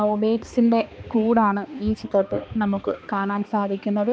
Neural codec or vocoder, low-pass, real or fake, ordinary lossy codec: codec, 16 kHz, 2 kbps, X-Codec, HuBERT features, trained on balanced general audio; none; fake; none